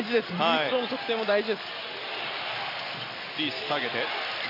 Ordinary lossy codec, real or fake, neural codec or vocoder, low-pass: AAC, 32 kbps; real; none; 5.4 kHz